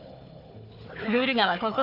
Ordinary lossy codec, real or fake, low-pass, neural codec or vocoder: none; fake; 5.4 kHz; codec, 16 kHz, 4 kbps, FunCodec, trained on Chinese and English, 50 frames a second